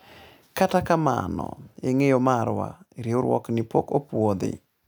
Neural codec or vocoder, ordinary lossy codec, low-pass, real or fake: none; none; none; real